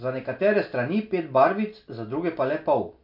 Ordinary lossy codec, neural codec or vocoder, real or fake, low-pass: MP3, 48 kbps; none; real; 5.4 kHz